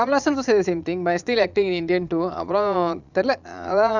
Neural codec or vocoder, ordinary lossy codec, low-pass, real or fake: vocoder, 22.05 kHz, 80 mel bands, Vocos; none; 7.2 kHz; fake